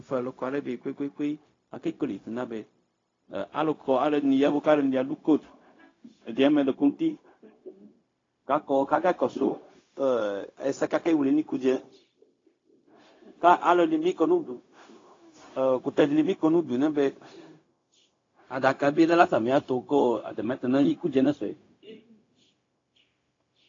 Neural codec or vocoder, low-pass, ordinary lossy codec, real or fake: codec, 16 kHz, 0.4 kbps, LongCat-Audio-Codec; 7.2 kHz; AAC, 32 kbps; fake